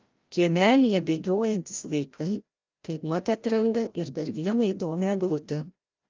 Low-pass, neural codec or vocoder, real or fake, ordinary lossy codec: 7.2 kHz; codec, 16 kHz, 0.5 kbps, FreqCodec, larger model; fake; Opus, 24 kbps